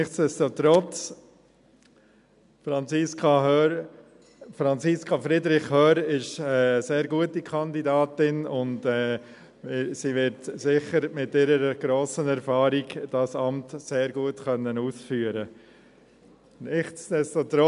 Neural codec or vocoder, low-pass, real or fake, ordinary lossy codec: none; 10.8 kHz; real; MP3, 96 kbps